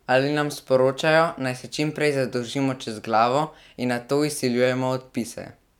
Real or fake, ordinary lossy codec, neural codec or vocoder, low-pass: real; none; none; 19.8 kHz